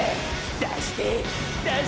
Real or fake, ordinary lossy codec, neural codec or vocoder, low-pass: real; none; none; none